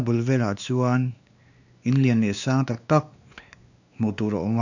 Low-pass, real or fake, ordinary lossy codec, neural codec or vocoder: 7.2 kHz; fake; none; codec, 16 kHz, 2 kbps, X-Codec, WavLM features, trained on Multilingual LibriSpeech